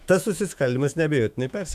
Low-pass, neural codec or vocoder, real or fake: 14.4 kHz; autoencoder, 48 kHz, 128 numbers a frame, DAC-VAE, trained on Japanese speech; fake